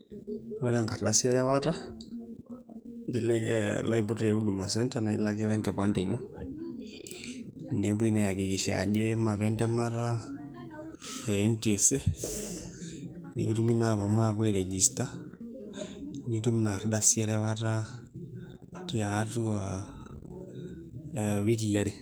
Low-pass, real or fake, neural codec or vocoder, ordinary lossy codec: none; fake; codec, 44.1 kHz, 2.6 kbps, SNAC; none